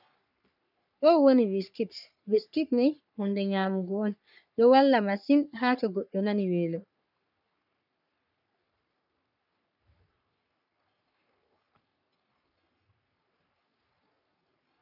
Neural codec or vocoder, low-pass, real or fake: codec, 44.1 kHz, 3.4 kbps, Pupu-Codec; 5.4 kHz; fake